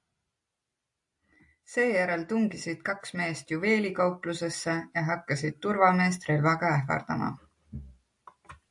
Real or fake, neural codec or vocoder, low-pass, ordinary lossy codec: real; none; 10.8 kHz; AAC, 64 kbps